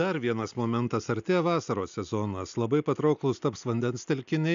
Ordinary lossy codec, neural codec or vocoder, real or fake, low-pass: AAC, 96 kbps; none; real; 7.2 kHz